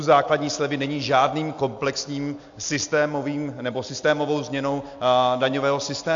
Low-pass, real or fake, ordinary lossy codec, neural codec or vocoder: 7.2 kHz; real; AAC, 64 kbps; none